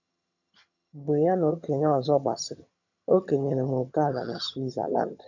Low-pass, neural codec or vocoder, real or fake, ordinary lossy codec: 7.2 kHz; vocoder, 22.05 kHz, 80 mel bands, HiFi-GAN; fake; none